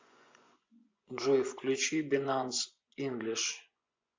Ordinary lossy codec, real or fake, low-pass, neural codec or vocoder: MP3, 64 kbps; fake; 7.2 kHz; vocoder, 24 kHz, 100 mel bands, Vocos